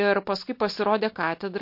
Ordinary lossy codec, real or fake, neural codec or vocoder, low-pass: MP3, 32 kbps; real; none; 5.4 kHz